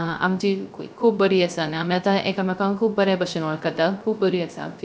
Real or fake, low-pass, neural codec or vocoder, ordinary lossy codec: fake; none; codec, 16 kHz, 0.3 kbps, FocalCodec; none